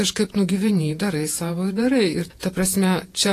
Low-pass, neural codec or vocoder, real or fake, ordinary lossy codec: 14.4 kHz; vocoder, 48 kHz, 128 mel bands, Vocos; fake; AAC, 48 kbps